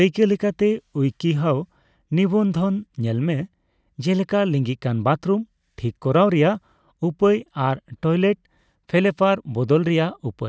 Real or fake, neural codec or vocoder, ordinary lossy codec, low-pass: real; none; none; none